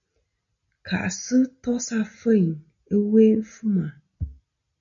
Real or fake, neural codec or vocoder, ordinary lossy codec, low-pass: real; none; MP3, 96 kbps; 7.2 kHz